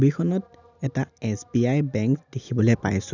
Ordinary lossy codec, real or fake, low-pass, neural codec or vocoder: none; real; 7.2 kHz; none